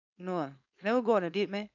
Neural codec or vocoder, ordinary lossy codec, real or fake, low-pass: codec, 16 kHz, 4.8 kbps, FACodec; none; fake; 7.2 kHz